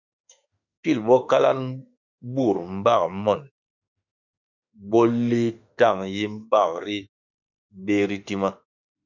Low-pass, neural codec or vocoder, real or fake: 7.2 kHz; autoencoder, 48 kHz, 32 numbers a frame, DAC-VAE, trained on Japanese speech; fake